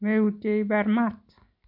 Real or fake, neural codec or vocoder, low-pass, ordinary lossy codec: real; none; 5.4 kHz; MP3, 48 kbps